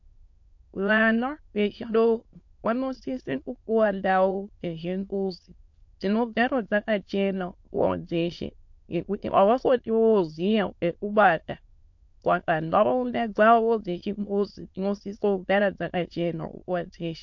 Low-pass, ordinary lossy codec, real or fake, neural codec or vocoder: 7.2 kHz; MP3, 48 kbps; fake; autoencoder, 22.05 kHz, a latent of 192 numbers a frame, VITS, trained on many speakers